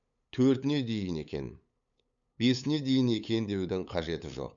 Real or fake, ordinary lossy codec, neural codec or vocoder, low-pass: fake; none; codec, 16 kHz, 8 kbps, FunCodec, trained on LibriTTS, 25 frames a second; 7.2 kHz